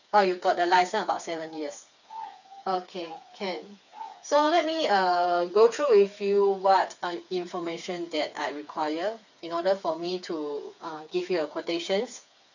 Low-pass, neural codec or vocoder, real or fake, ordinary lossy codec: 7.2 kHz; codec, 16 kHz, 4 kbps, FreqCodec, smaller model; fake; none